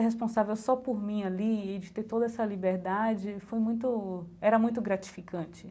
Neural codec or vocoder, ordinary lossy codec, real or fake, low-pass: none; none; real; none